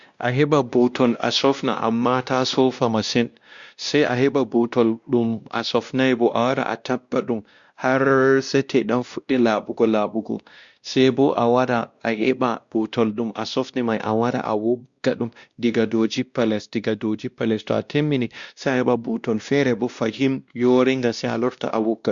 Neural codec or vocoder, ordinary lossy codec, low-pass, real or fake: codec, 16 kHz, 1 kbps, X-Codec, WavLM features, trained on Multilingual LibriSpeech; Opus, 64 kbps; 7.2 kHz; fake